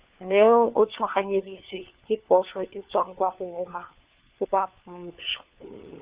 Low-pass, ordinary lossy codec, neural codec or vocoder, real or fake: 3.6 kHz; Opus, 64 kbps; codec, 16 kHz, 4 kbps, FunCodec, trained on LibriTTS, 50 frames a second; fake